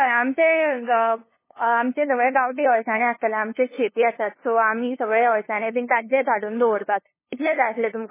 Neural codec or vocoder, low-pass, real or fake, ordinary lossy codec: codec, 16 kHz, 2 kbps, FunCodec, trained on LibriTTS, 25 frames a second; 3.6 kHz; fake; MP3, 16 kbps